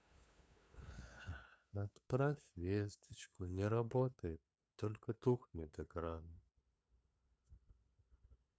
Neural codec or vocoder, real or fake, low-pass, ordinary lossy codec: codec, 16 kHz, 2 kbps, FunCodec, trained on LibriTTS, 25 frames a second; fake; none; none